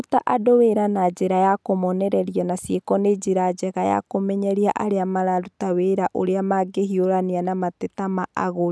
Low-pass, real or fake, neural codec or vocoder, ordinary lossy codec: none; real; none; none